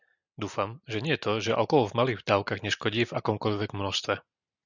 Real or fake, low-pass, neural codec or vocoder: real; 7.2 kHz; none